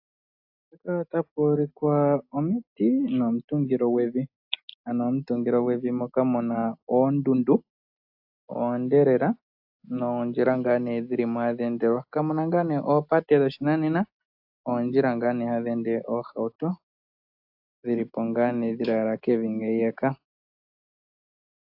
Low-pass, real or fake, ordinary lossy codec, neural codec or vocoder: 5.4 kHz; real; AAC, 48 kbps; none